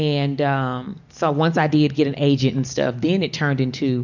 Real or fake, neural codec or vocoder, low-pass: real; none; 7.2 kHz